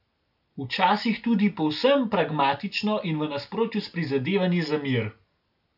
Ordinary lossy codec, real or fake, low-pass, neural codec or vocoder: AAC, 48 kbps; real; 5.4 kHz; none